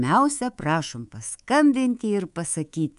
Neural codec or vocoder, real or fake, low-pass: codec, 24 kHz, 3.1 kbps, DualCodec; fake; 10.8 kHz